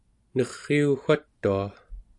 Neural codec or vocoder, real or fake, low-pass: none; real; 10.8 kHz